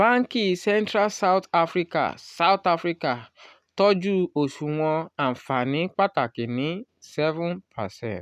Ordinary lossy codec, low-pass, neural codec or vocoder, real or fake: none; 14.4 kHz; none; real